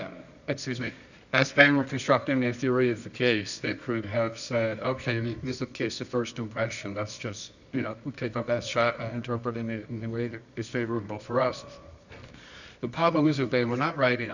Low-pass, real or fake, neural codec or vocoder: 7.2 kHz; fake; codec, 24 kHz, 0.9 kbps, WavTokenizer, medium music audio release